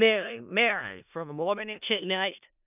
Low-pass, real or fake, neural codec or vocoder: 3.6 kHz; fake; codec, 16 kHz in and 24 kHz out, 0.4 kbps, LongCat-Audio-Codec, four codebook decoder